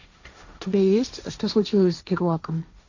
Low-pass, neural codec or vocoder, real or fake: 7.2 kHz; codec, 16 kHz, 1.1 kbps, Voila-Tokenizer; fake